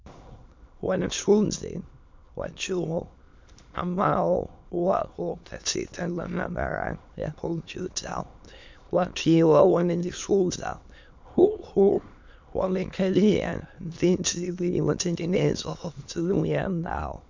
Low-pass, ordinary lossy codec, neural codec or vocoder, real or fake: 7.2 kHz; MP3, 64 kbps; autoencoder, 22.05 kHz, a latent of 192 numbers a frame, VITS, trained on many speakers; fake